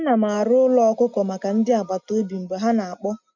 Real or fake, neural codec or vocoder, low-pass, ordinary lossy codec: real; none; 7.2 kHz; none